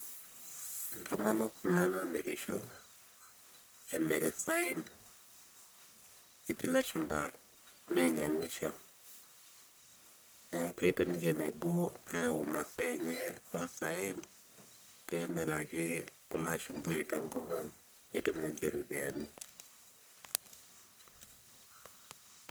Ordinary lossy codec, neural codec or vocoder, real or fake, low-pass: none; codec, 44.1 kHz, 1.7 kbps, Pupu-Codec; fake; none